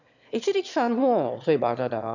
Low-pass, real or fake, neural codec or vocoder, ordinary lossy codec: 7.2 kHz; fake; autoencoder, 22.05 kHz, a latent of 192 numbers a frame, VITS, trained on one speaker; none